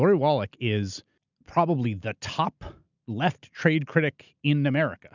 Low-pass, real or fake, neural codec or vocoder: 7.2 kHz; real; none